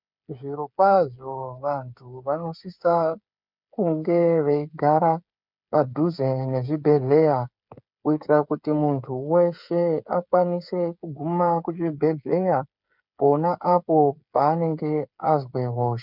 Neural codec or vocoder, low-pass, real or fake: codec, 16 kHz, 8 kbps, FreqCodec, smaller model; 5.4 kHz; fake